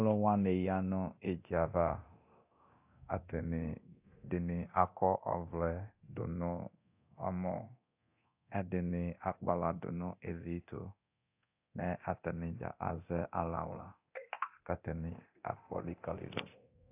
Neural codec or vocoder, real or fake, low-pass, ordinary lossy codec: codec, 24 kHz, 0.9 kbps, DualCodec; fake; 3.6 kHz; AAC, 32 kbps